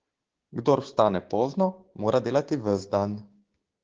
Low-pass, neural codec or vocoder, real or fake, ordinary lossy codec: 7.2 kHz; codec, 16 kHz, 6 kbps, DAC; fake; Opus, 16 kbps